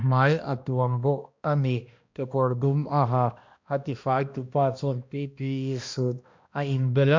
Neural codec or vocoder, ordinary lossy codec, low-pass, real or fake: codec, 16 kHz, 1 kbps, X-Codec, HuBERT features, trained on balanced general audio; MP3, 64 kbps; 7.2 kHz; fake